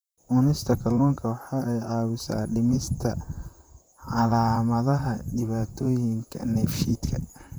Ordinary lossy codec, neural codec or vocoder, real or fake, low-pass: none; vocoder, 44.1 kHz, 128 mel bands, Pupu-Vocoder; fake; none